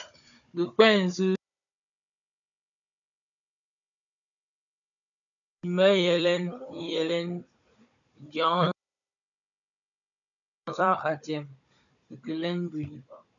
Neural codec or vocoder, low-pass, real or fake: codec, 16 kHz, 16 kbps, FunCodec, trained on Chinese and English, 50 frames a second; 7.2 kHz; fake